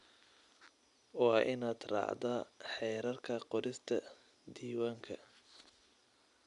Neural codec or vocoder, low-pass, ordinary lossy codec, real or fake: none; 10.8 kHz; none; real